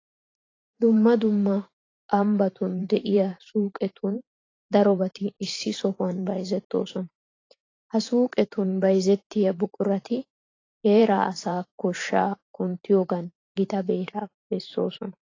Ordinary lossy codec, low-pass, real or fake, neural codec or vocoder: AAC, 32 kbps; 7.2 kHz; fake; vocoder, 44.1 kHz, 128 mel bands every 512 samples, BigVGAN v2